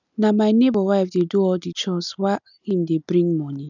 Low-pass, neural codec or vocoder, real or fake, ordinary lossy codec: 7.2 kHz; none; real; none